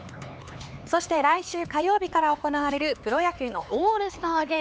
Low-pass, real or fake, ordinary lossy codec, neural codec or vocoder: none; fake; none; codec, 16 kHz, 4 kbps, X-Codec, HuBERT features, trained on LibriSpeech